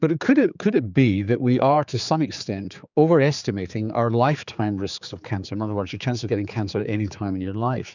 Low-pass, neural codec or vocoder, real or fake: 7.2 kHz; codec, 16 kHz, 4 kbps, X-Codec, HuBERT features, trained on general audio; fake